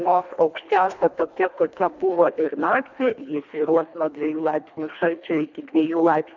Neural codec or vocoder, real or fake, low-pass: codec, 24 kHz, 1.5 kbps, HILCodec; fake; 7.2 kHz